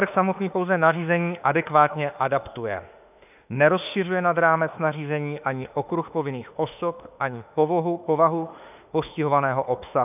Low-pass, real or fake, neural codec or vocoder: 3.6 kHz; fake; autoencoder, 48 kHz, 32 numbers a frame, DAC-VAE, trained on Japanese speech